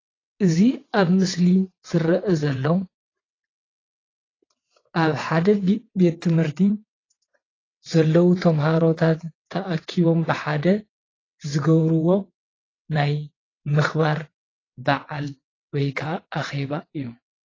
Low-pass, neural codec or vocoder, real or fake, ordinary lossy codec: 7.2 kHz; vocoder, 22.05 kHz, 80 mel bands, WaveNeXt; fake; AAC, 32 kbps